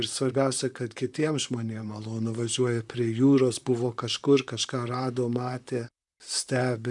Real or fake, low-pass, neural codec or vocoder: fake; 10.8 kHz; vocoder, 44.1 kHz, 128 mel bands, Pupu-Vocoder